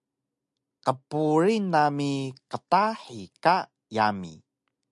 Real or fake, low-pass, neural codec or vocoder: real; 10.8 kHz; none